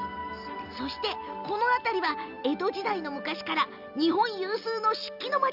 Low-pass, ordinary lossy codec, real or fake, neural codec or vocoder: 5.4 kHz; none; real; none